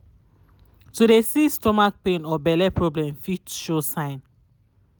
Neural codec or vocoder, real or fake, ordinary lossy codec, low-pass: none; real; none; none